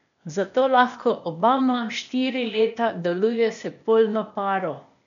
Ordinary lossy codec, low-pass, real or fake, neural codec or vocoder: none; 7.2 kHz; fake; codec, 16 kHz, 0.8 kbps, ZipCodec